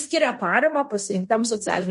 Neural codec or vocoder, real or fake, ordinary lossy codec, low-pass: codec, 16 kHz in and 24 kHz out, 0.9 kbps, LongCat-Audio-Codec, fine tuned four codebook decoder; fake; MP3, 48 kbps; 10.8 kHz